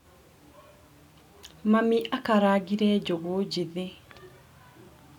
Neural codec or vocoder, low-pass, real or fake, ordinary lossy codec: none; 19.8 kHz; real; none